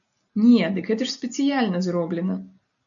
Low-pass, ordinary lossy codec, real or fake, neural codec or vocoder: 7.2 kHz; MP3, 96 kbps; real; none